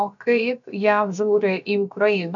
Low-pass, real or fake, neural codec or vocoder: 7.2 kHz; fake; codec, 16 kHz, about 1 kbps, DyCAST, with the encoder's durations